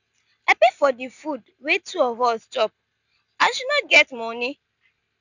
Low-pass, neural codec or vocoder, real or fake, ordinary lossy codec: 7.2 kHz; none; real; none